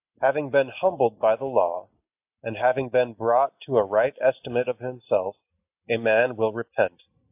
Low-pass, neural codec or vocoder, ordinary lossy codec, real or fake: 3.6 kHz; none; AAC, 32 kbps; real